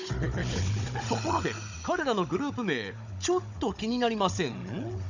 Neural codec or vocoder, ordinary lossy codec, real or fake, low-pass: codec, 16 kHz, 16 kbps, FunCodec, trained on Chinese and English, 50 frames a second; none; fake; 7.2 kHz